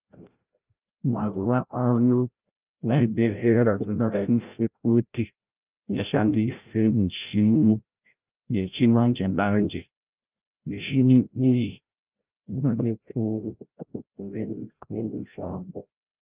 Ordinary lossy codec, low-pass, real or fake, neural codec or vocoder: Opus, 24 kbps; 3.6 kHz; fake; codec, 16 kHz, 0.5 kbps, FreqCodec, larger model